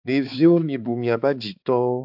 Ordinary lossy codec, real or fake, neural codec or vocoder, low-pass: AAC, 48 kbps; fake; codec, 16 kHz, 4 kbps, X-Codec, HuBERT features, trained on balanced general audio; 5.4 kHz